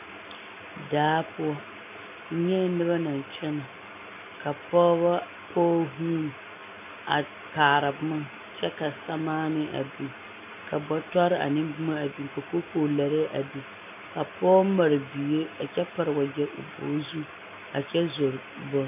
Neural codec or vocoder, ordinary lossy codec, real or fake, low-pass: none; MP3, 24 kbps; real; 3.6 kHz